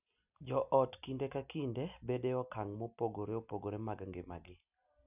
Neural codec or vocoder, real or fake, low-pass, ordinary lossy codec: none; real; 3.6 kHz; none